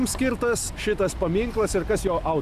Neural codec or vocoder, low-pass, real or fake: none; 14.4 kHz; real